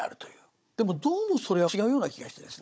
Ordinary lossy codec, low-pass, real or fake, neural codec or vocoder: none; none; fake; codec, 16 kHz, 16 kbps, FunCodec, trained on Chinese and English, 50 frames a second